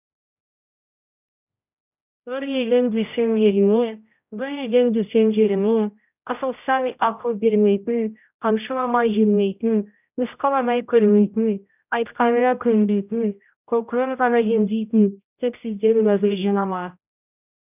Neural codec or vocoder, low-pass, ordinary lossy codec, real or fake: codec, 16 kHz, 0.5 kbps, X-Codec, HuBERT features, trained on general audio; 3.6 kHz; none; fake